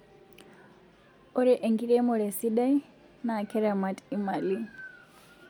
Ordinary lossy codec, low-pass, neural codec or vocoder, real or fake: none; 19.8 kHz; none; real